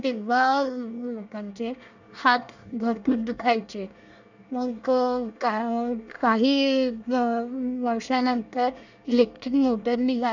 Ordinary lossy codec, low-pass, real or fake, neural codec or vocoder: none; 7.2 kHz; fake; codec, 24 kHz, 1 kbps, SNAC